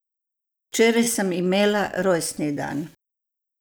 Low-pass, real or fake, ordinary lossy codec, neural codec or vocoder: none; real; none; none